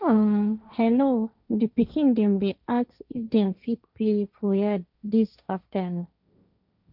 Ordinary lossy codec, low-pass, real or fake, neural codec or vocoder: none; 5.4 kHz; fake; codec, 16 kHz, 1.1 kbps, Voila-Tokenizer